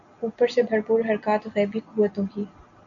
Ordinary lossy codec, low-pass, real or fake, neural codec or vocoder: AAC, 64 kbps; 7.2 kHz; real; none